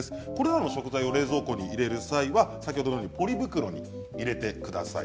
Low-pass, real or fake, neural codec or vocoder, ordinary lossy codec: none; real; none; none